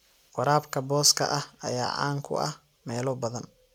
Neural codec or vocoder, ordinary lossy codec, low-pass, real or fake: none; none; 19.8 kHz; real